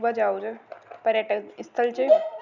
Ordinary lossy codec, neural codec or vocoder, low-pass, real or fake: none; none; 7.2 kHz; real